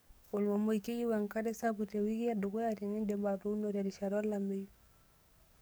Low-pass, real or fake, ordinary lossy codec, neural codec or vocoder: none; fake; none; codec, 44.1 kHz, 7.8 kbps, DAC